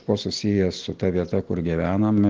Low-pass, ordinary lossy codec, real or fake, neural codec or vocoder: 7.2 kHz; Opus, 16 kbps; real; none